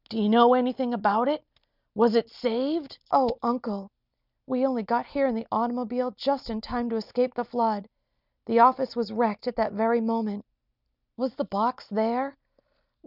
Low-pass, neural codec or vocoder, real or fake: 5.4 kHz; none; real